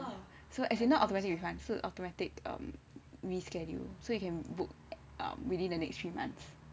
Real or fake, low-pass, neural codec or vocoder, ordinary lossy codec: real; none; none; none